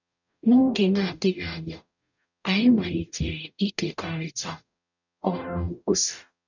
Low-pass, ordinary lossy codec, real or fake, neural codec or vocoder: 7.2 kHz; none; fake; codec, 44.1 kHz, 0.9 kbps, DAC